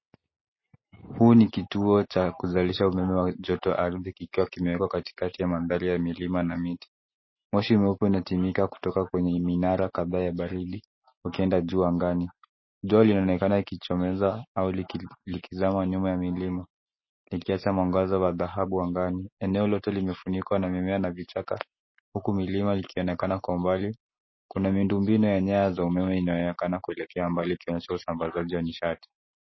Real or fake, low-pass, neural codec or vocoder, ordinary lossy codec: real; 7.2 kHz; none; MP3, 24 kbps